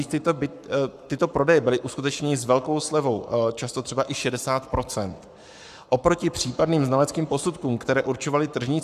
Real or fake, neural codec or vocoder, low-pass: fake; codec, 44.1 kHz, 7.8 kbps, Pupu-Codec; 14.4 kHz